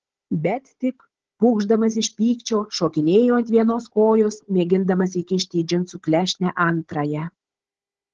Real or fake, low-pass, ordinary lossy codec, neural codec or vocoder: fake; 7.2 kHz; Opus, 16 kbps; codec, 16 kHz, 16 kbps, FunCodec, trained on Chinese and English, 50 frames a second